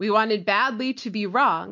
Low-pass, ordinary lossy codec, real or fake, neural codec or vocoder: 7.2 kHz; MP3, 48 kbps; real; none